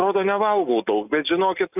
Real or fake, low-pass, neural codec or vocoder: real; 3.6 kHz; none